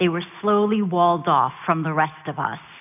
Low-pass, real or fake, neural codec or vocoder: 3.6 kHz; real; none